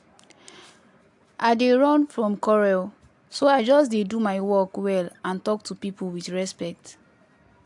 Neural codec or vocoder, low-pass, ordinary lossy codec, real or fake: none; 10.8 kHz; AAC, 64 kbps; real